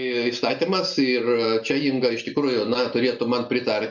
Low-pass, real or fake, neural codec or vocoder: 7.2 kHz; real; none